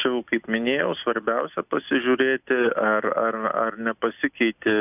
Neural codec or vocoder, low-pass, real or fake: none; 3.6 kHz; real